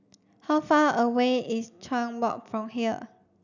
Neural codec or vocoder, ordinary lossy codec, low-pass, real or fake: none; none; 7.2 kHz; real